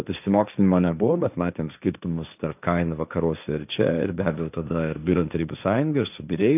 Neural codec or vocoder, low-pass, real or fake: codec, 16 kHz, 1.1 kbps, Voila-Tokenizer; 3.6 kHz; fake